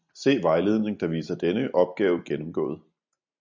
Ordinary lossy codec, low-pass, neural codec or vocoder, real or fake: MP3, 64 kbps; 7.2 kHz; none; real